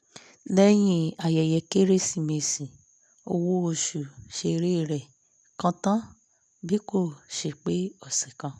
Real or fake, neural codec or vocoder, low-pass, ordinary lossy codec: real; none; none; none